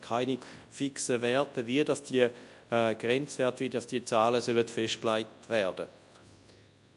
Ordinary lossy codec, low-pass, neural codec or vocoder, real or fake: AAC, 64 kbps; 10.8 kHz; codec, 24 kHz, 0.9 kbps, WavTokenizer, large speech release; fake